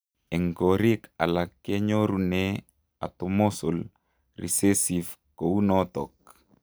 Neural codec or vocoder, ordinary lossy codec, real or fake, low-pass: none; none; real; none